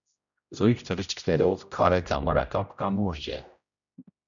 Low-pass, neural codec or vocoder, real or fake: 7.2 kHz; codec, 16 kHz, 0.5 kbps, X-Codec, HuBERT features, trained on general audio; fake